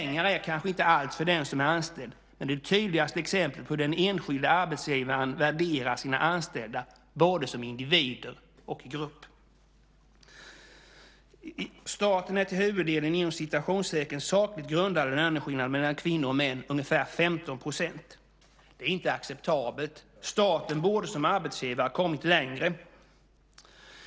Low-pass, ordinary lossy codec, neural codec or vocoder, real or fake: none; none; none; real